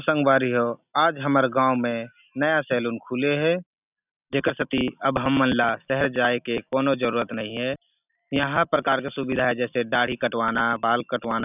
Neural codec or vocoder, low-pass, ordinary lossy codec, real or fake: none; 3.6 kHz; none; real